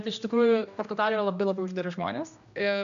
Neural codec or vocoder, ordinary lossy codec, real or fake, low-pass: codec, 16 kHz, 1 kbps, X-Codec, HuBERT features, trained on general audio; AAC, 64 kbps; fake; 7.2 kHz